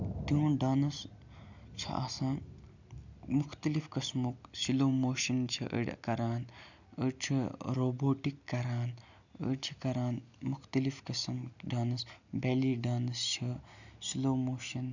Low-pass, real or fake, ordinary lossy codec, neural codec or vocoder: 7.2 kHz; real; none; none